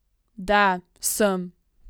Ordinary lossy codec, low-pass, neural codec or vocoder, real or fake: none; none; none; real